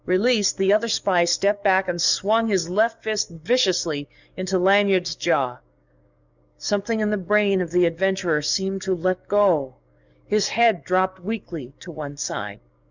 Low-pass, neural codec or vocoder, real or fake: 7.2 kHz; codec, 44.1 kHz, 7.8 kbps, DAC; fake